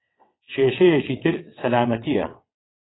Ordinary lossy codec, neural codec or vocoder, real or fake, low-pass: AAC, 16 kbps; vocoder, 22.05 kHz, 80 mel bands, WaveNeXt; fake; 7.2 kHz